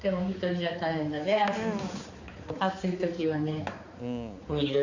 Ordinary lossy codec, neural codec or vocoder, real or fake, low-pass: Opus, 64 kbps; codec, 16 kHz, 4 kbps, X-Codec, HuBERT features, trained on balanced general audio; fake; 7.2 kHz